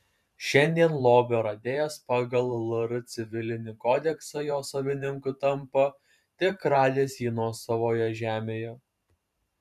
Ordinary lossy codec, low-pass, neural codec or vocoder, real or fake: MP3, 96 kbps; 14.4 kHz; vocoder, 44.1 kHz, 128 mel bands every 512 samples, BigVGAN v2; fake